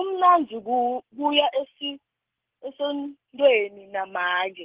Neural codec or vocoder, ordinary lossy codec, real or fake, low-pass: none; Opus, 16 kbps; real; 3.6 kHz